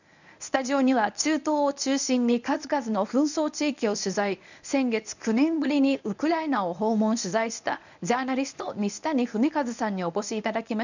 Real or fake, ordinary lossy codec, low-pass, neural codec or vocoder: fake; none; 7.2 kHz; codec, 24 kHz, 0.9 kbps, WavTokenizer, medium speech release version 1